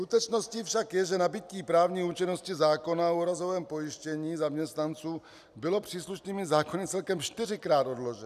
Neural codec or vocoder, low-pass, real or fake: none; 14.4 kHz; real